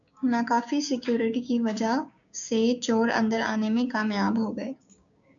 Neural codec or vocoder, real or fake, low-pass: codec, 16 kHz, 6 kbps, DAC; fake; 7.2 kHz